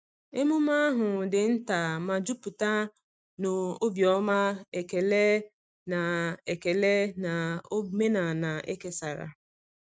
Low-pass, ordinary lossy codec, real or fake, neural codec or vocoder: none; none; real; none